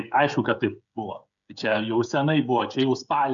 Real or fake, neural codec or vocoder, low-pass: fake; codec, 16 kHz, 16 kbps, FreqCodec, smaller model; 7.2 kHz